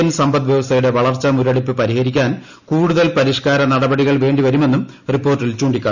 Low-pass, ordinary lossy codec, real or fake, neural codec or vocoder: 7.2 kHz; none; real; none